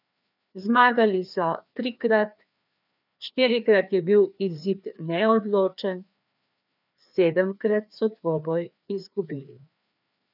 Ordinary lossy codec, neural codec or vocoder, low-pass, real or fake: none; codec, 16 kHz, 2 kbps, FreqCodec, larger model; 5.4 kHz; fake